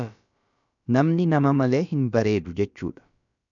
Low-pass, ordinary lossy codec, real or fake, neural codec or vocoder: 7.2 kHz; MP3, 96 kbps; fake; codec, 16 kHz, about 1 kbps, DyCAST, with the encoder's durations